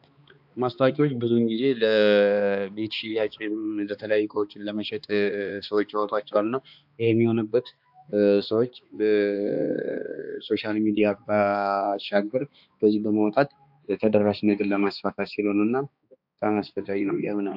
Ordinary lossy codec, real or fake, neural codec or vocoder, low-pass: AAC, 48 kbps; fake; codec, 16 kHz, 2 kbps, X-Codec, HuBERT features, trained on balanced general audio; 5.4 kHz